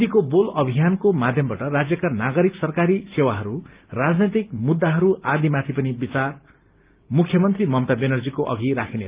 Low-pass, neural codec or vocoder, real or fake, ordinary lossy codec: 3.6 kHz; none; real; Opus, 32 kbps